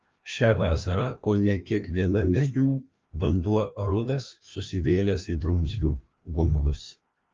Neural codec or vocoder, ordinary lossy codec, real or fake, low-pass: codec, 16 kHz, 1 kbps, FreqCodec, larger model; Opus, 32 kbps; fake; 7.2 kHz